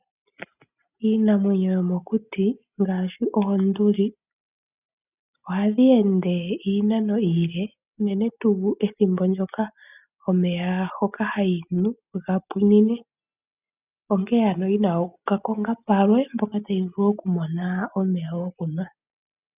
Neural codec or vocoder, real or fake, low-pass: none; real; 3.6 kHz